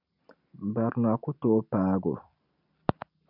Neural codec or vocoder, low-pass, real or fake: none; 5.4 kHz; real